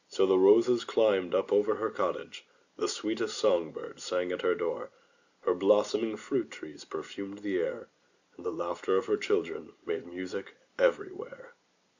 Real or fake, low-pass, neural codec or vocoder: real; 7.2 kHz; none